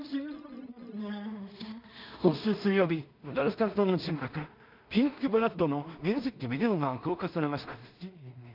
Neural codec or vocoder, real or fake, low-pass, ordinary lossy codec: codec, 16 kHz in and 24 kHz out, 0.4 kbps, LongCat-Audio-Codec, two codebook decoder; fake; 5.4 kHz; none